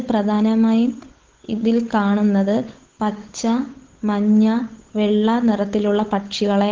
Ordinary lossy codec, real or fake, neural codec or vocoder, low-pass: Opus, 16 kbps; fake; codec, 16 kHz, 8 kbps, FunCodec, trained on Chinese and English, 25 frames a second; 7.2 kHz